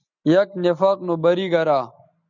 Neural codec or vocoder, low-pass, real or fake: none; 7.2 kHz; real